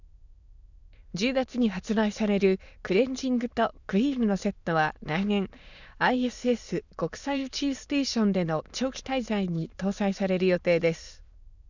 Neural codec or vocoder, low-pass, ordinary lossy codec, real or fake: autoencoder, 22.05 kHz, a latent of 192 numbers a frame, VITS, trained on many speakers; 7.2 kHz; none; fake